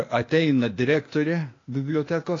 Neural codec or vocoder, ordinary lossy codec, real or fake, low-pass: codec, 16 kHz, 0.8 kbps, ZipCodec; AAC, 32 kbps; fake; 7.2 kHz